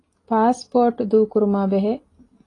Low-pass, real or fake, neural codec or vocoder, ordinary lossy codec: 10.8 kHz; real; none; AAC, 32 kbps